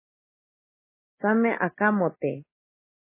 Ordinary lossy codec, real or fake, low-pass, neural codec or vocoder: MP3, 16 kbps; real; 3.6 kHz; none